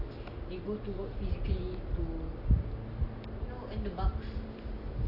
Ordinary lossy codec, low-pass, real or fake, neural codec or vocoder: AAC, 32 kbps; 5.4 kHz; real; none